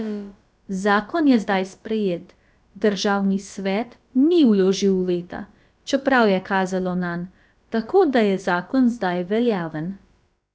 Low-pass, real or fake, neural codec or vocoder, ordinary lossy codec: none; fake; codec, 16 kHz, about 1 kbps, DyCAST, with the encoder's durations; none